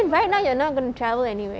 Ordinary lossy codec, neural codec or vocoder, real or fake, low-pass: none; none; real; none